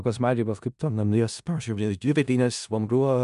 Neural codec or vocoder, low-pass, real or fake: codec, 16 kHz in and 24 kHz out, 0.4 kbps, LongCat-Audio-Codec, four codebook decoder; 10.8 kHz; fake